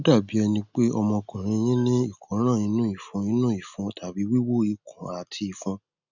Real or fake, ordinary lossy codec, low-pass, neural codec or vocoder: real; none; 7.2 kHz; none